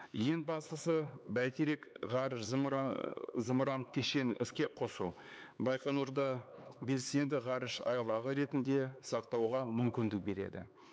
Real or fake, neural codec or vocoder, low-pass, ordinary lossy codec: fake; codec, 16 kHz, 4 kbps, X-Codec, HuBERT features, trained on LibriSpeech; none; none